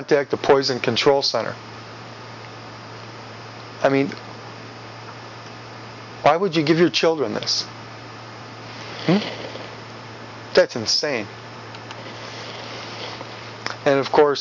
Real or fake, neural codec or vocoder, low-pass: real; none; 7.2 kHz